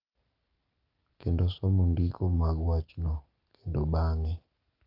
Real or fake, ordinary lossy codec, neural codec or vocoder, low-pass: real; Opus, 32 kbps; none; 5.4 kHz